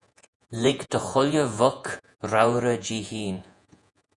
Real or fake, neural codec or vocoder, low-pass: fake; vocoder, 48 kHz, 128 mel bands, Vocos; 10.8 kHz